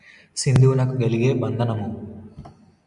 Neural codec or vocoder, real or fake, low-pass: none; real; 10.8 kHz